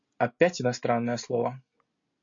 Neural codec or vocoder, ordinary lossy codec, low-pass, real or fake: none; MP3, 48 kbps; 7.2 kHz; real